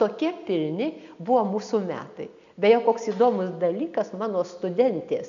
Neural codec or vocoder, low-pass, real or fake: none; 7.2 kHz; real